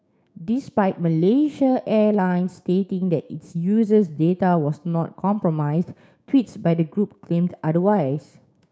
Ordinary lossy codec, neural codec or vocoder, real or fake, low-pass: none; codec, 16 kHz, 6 kbps, DAC; fake; none